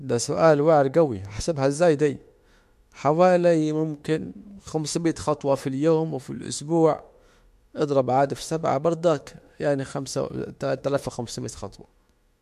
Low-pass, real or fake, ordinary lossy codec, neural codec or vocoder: 14.4 kHz; fake; MP3, 64 kbps; autoencoder, 48 kHz, 32 numbers a frame, DAC-VAE, trained on Japanese speech